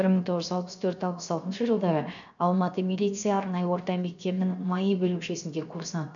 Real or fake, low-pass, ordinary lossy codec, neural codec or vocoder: fake; 7.2 kHz; none; codec, 16 kHz, 0.7 kbps, FocalCodec